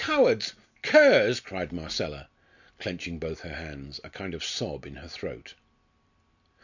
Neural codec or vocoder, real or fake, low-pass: none; real; 7.2 kHz